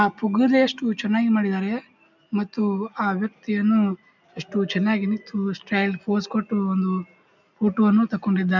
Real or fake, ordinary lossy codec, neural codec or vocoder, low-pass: real; none; none; 7.2 kHz